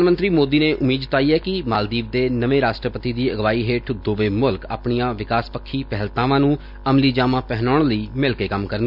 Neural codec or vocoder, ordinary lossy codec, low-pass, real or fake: none; none; 5.4 kHz; real